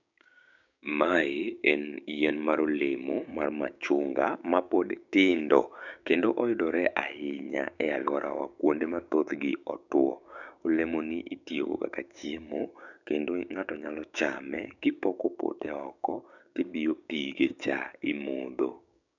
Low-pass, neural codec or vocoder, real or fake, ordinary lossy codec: 7.2 kHz; codec, 16 kHz, 6 kbps, DAC; fake; none